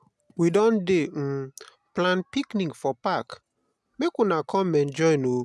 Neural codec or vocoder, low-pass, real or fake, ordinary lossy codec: none; none; real; none